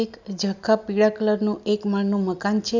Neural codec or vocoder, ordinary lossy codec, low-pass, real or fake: none; none; 7.2 kHz; real